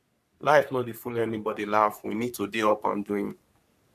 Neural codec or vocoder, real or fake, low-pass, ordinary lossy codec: codec, 44.1 kHz, 3.4 kbps, Pupu-Codec; fake; 14.4 kHz; none